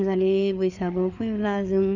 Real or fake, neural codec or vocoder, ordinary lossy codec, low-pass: fake; codec, 16 kHz, 4 kbps, FreqCodec, larger model; none; 7.2 kHz